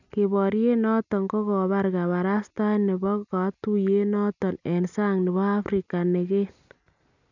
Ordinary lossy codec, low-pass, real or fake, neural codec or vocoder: none; 7.2 kHz; real; none